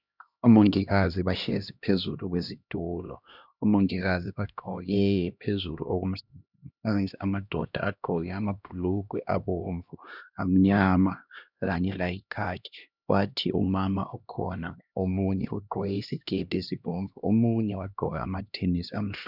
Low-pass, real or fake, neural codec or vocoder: 5.4 kHz; fake; codec, 16 kHz, 1 kbps, X-Codec, HuBERT features, trained on LibriSpeech